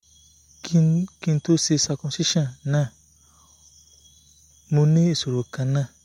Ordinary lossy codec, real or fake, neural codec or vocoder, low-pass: MP3, 64 kbps; real; none; 19.8 kHz